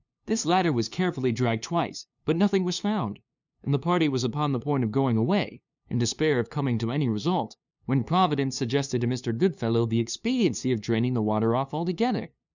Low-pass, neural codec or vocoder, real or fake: 7.2 kHz; codec, 16 kHz, 2 kbps, FunCodec, trained on LibriTTS, 25 frames a second; fake